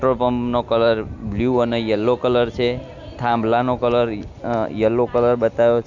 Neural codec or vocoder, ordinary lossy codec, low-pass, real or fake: none; none; 7.2 kHz; real